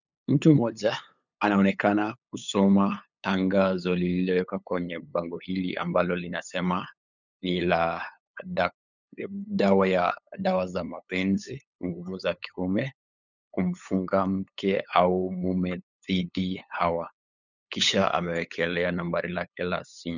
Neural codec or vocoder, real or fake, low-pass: codec, 16 kHz, 8 kbps, FunCodec, trained on LibriTTS, 25 frames a second; fake; 7.2 kHz